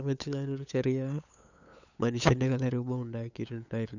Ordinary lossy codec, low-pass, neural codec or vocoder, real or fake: none; 7.2 kHz; codec, 16 kHz, 8 kbps, FunCodec, trained on LibriTTS, 25 frames a second; fake